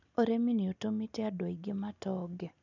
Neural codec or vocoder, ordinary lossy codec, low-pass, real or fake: none; none; 7.2 kHz; real